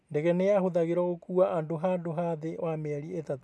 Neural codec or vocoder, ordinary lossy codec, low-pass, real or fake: none; none; none; real